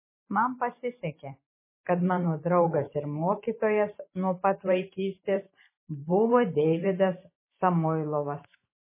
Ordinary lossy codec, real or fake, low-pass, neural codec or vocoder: MP3, 16 kbps; fake; 3.6 kHz; vocoder, 44.1 kHz, 128 mel bands every 512 samples, BigVGAN v2